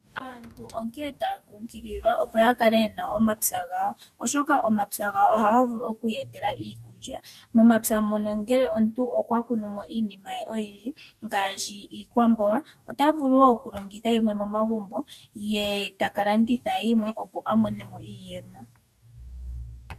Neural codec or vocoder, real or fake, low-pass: codec, 44.1 kHz, 2.6 kbps, DAC; fake; 14.4 kHz